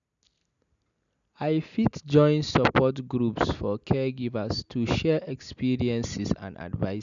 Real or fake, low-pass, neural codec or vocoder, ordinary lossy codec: real; 7.2 kHz; none; none